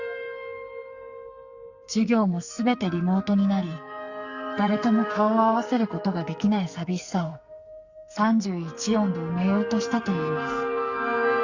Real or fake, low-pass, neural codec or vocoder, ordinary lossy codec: fake; 7.2 kHz; codec, 44.1 kHz, 2.6 kbps, SNAC; Opus, 64 kbps